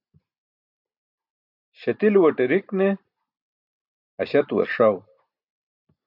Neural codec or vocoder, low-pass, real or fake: none; 5.4 kHz; real